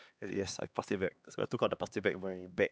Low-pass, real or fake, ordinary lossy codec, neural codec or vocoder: none; fake; none; codec, 16 kHz, 2 kbps, X-Codec, HuBERT features, trained on LibriSpeech